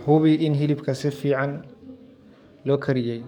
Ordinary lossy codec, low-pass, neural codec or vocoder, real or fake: none; 19.8 kHz; codec, 44.1 kHz, 7.8 kbps, DAC; fake